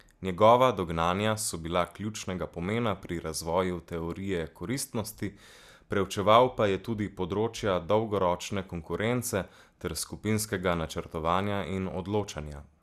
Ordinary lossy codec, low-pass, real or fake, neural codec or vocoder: Opus, 64 kbps; 14.4 kHz; real; none